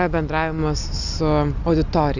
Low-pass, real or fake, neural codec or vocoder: 7.2 kHz; real; none